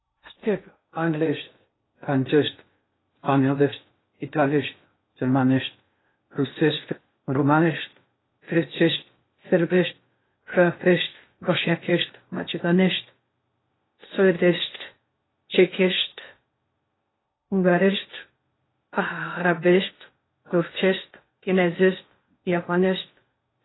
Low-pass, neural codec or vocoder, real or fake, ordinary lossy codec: 7.2 kHz; codec, 16 kHz in and 24 kHz out, 0.6 kbps, FocalCodec, streaming, 2048 codes; fake; AAC, 16 kbps